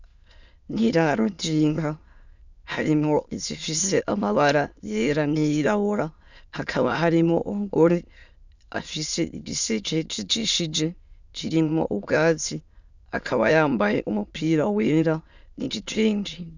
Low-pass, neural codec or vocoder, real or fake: 7.2 kHz; autoencoder, 22.05 kHz, a latent of 192 numbers a frame, VITS, trained on many speakers; fake